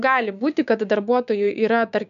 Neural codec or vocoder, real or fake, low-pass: codec, 16 kHz, 6 kbps, DAC; fake; 7.2 kHz